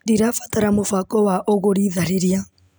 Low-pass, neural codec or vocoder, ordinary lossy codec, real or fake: none; none; none; real